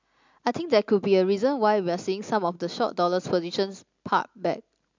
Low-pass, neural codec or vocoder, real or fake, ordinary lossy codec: 7.2 kHz; none; real; MP3, 64 kbps